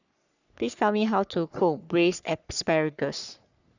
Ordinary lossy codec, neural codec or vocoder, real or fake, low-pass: none; codec, 44.1 kHz, 3.4 kbps, Pupu-Codec; fake; 7.2 kHz